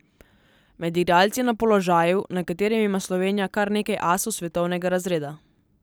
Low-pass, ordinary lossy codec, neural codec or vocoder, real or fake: none; none; none; real